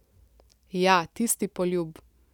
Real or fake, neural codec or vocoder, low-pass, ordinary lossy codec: real; none; 19.8 kHz; none